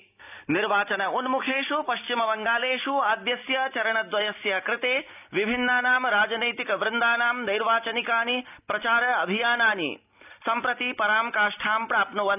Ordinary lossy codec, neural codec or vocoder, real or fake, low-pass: none; none; real; 3.6 kHz